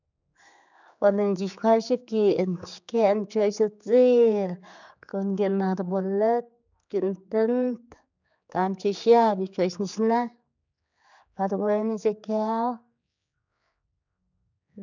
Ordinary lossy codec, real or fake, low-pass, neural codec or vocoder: none; fake; 7.2 kHz; codec, 16 kHz, 4 kbps, X-Codec, HuBERT features, trained on general audio